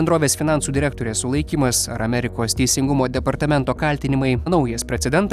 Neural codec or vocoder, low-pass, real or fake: none; 14.4 kHz; real